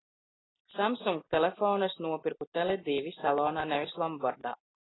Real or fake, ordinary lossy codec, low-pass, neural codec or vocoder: real; AAC, 16 kbps; 7.2 kHz; none